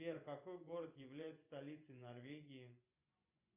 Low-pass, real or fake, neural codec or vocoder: 3.6 kHz; fake; vocoder, 24 kHz, 100 mel bands, Vocos